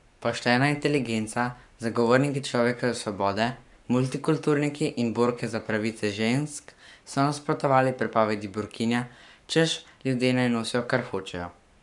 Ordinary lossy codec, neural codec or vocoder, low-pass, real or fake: none; codec, 44.1 kHz, 7.8 kbps, Pupu-Codec; 10.8 kHz; fake